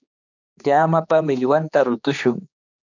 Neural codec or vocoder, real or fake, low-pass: codec, 16 kHz, 2 kbps, X-Codec, HuBERT features, trained on general audio; fake; 7.2 kHz